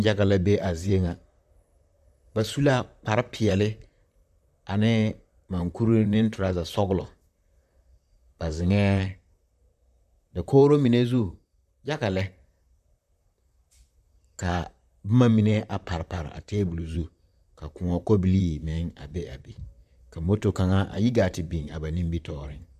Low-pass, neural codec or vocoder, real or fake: 14.4 kHz; vocoder, 44.1 kHz, 128 mel bands, Pupu-Vocoder; fake